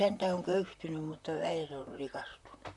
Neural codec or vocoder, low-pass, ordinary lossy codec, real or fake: vocoder, 44.1 kHz, 128 mel bands, Pupu-Vocoder; 10.8 kHz; none; fake